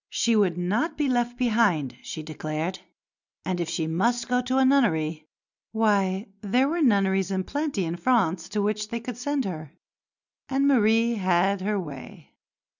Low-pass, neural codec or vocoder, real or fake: 7.2 kHz; none; real